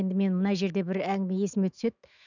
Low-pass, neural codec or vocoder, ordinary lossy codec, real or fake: 7.2 kHz; autoencoder, 48 kHz, 128 numbers a frame, DAC-VAE, trained on Japanese speech; none; fake